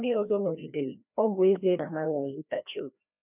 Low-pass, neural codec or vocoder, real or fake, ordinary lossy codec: 3.6 kHz; codec, 16 kHz, 1 kbps, FreqCodec, larger model; fake; none